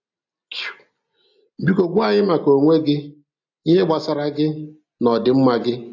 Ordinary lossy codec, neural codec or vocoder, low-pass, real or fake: AAC, 48 kbps; none; 7.2 kHz; real